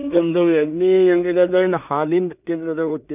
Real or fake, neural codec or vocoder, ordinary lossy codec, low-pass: fake; codec, 16 kHz in and 24 kHz out, 0.4 kbps, LongCat-Audio-Codec, two codebook decoder; none; 3.6 kHz